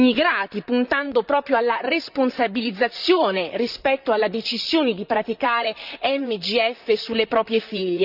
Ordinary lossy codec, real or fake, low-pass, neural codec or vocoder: none; fake; 5.4 kHz; vocoder, 44.1 kHz, 128 mel bands, Pupu-Vocoder